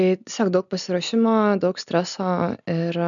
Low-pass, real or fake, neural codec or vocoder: 7.2 kHz; real; none